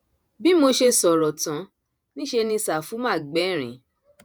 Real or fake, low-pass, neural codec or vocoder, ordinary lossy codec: fake; none; vocoder, 48 kHz, 128 mel bands, Vocos; none